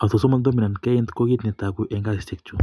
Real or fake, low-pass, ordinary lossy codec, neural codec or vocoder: real; none; none; none